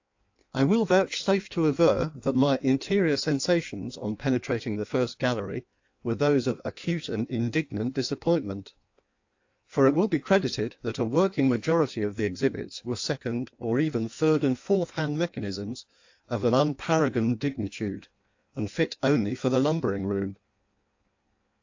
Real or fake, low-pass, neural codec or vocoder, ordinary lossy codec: fake; 7.2 kHz; codec, 16 kHz in and 24 kHz out, 1.1 kbps, FireRedTTS-2 codec; AAC, 48 kbps